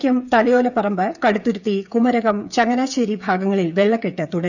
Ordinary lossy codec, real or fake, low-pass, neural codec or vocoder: none; fake; 7.2 kHz; codec, 16 kHz, 8 kbps, FreqCodec, smaller model